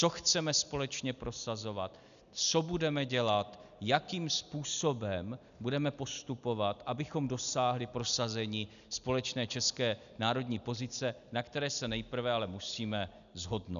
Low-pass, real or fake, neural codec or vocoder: 7.2 kHz; real; none